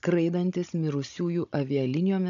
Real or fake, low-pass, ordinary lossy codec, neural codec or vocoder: fake; 7.2 kHz; AAC, 64 kbps; codec, 16 kHz, 16 kbps, FunCodec, trained on Chinese and English, 50 frames a second